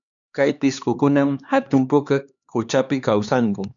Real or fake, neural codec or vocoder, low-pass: fake; codec, 16 kHz, 2 kbps, X-Codec, HuBERT features, trained on LibriSpeech; 7.2 kHz